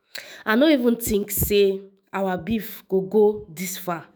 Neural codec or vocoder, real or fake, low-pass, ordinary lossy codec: autoencoder, 48 kHz, 128 numbers a frame, DAC-VAE, trained on Japanese speech; fake; none; none